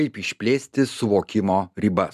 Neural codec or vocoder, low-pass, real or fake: none; 14.4 kHz; real